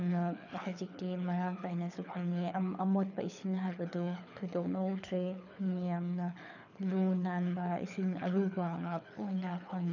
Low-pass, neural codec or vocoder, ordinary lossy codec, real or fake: 7.2 kHz; codec, 24 kHz, 6 kbps, HILCodec; none; fake